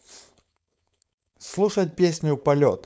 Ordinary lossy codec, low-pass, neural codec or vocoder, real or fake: none; none; codec, 16 kHz, 4.8 kbps, FACodec; fake